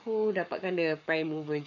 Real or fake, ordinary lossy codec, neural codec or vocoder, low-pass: fake; none; codec, 44.1 kHz, 7.8 kbps, Pupu-Codec; 7.2 kHz